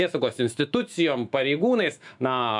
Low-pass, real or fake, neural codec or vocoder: 10.8 kHz; fake; autoencoder, 48 kHz, 128 numbers a frame, DAC-VAE, trained on Japanese speech